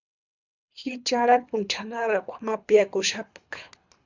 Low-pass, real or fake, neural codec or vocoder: 7.2 kHz; fake; codec, 24 kHz, 3 kbps, HILCodec